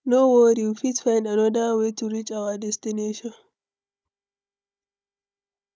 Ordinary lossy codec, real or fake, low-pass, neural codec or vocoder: none; fake; none; codec, 16 kHz, 16 kbps, FunCodec, trained on Chinese and English, 50 frames a second